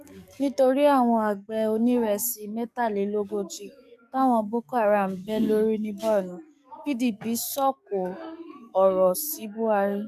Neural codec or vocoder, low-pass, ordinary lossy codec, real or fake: codec, 44.1 kHz, 7.8 kbps, DAC; 14.4 kHz; none; fake